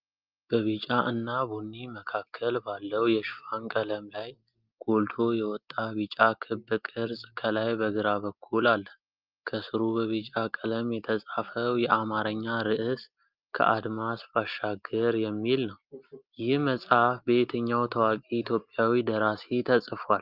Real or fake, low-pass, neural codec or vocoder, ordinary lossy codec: real; 5.4 kHz; none; Opus, 24 kbps